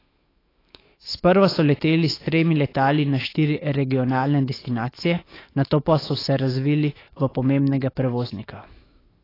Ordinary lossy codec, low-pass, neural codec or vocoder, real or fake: AAC, 24 kbps; 5.4 kHz; autoencoder, 48 kHz, 128 numbers a frame, DAC-VAE, trained on Japanese speech; fake